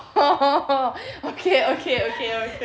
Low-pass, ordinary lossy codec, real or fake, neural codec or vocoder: none; none; real; none